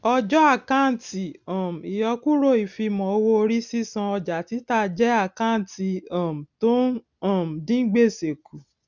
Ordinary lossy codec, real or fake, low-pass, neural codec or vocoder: none; real; 7.2 kHz; none